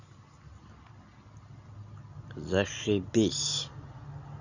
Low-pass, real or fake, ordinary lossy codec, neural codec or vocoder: 7.2 kHz; real; none; none